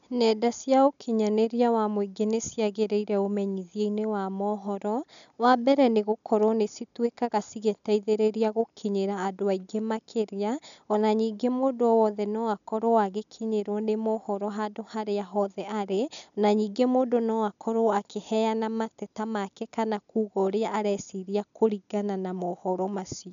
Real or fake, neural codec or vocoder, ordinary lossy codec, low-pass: real; none; none; 7.2 kHz